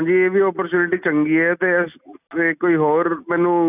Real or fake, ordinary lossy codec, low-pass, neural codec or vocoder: real; none; 3.6 kHz; none